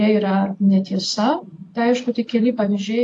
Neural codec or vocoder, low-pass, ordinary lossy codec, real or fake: none; 10.8 kHz; AAC, 48 kbps; real